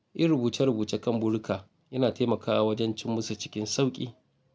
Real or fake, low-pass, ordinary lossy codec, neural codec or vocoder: real; none; none; none